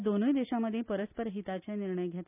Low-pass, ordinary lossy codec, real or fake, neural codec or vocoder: 3.6 kHz; none; real; none